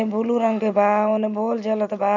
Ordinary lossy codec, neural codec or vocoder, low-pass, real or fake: none; none; 7.2 kHz; real